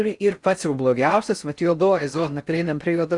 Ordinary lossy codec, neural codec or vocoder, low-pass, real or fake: Opus, 64 kbps; codec, 16 kHz in and 24 kHz out, 0.6 kbps, FocalCodec, streaming, 4096 codes; 10.8 kHz; fake